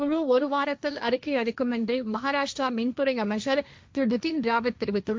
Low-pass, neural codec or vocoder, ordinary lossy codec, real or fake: none; codec, 16 kHz, 1.1 kbps, Voila-Tokenizer; none; fake